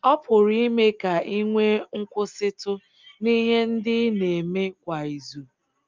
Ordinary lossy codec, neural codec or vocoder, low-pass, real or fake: Opus, 24 kbps; none; 7.2 kHz; real